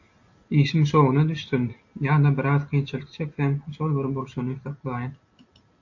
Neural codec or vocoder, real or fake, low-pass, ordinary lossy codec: none; real; 7.2 kHz; AAC, 48 kbps